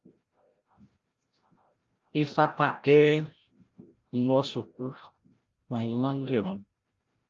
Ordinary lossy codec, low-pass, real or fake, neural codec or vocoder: Opus, 32 kbps; 7.2 kHz; fake; codec, 16 kHz, 0.5 kbps, FreqCodec, larger model